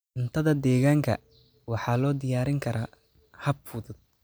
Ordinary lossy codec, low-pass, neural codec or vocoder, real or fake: none; none; none; real